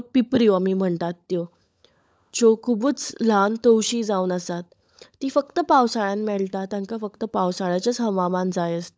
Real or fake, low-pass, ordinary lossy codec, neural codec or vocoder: fake; none; none; codec, 16 kHz, 16 kbps, FunCodec, trained on LibriTTS, 50 frames a second